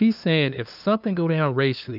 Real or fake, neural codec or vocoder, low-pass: fake; codec, 16 kHz, 2 kbps, FunCodec, trained on LibriTTS, 25 frames a second; 5.4 kHz